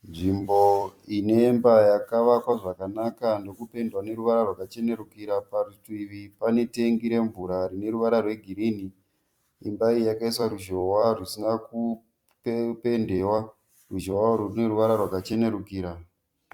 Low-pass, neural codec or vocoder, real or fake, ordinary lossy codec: 19.8 kHz; vocoder, 44.1 kHz, 128 mel bands every 256 samples, BigVGAN v2; fake; Opus, 64 kbps